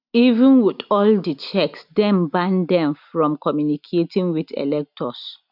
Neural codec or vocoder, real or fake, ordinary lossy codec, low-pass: none; real; none; 5.4 kHz